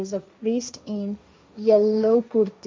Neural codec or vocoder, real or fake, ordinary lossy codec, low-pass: codec, 16 kHz, 1.1 kbps, Voila-Tokenizer; fake; none; none